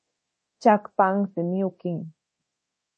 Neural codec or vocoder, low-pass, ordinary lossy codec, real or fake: codec, 24 kHz, 0.9 kbps, DualCodec; 10.8 kHz; MP3, 32 kbps; fake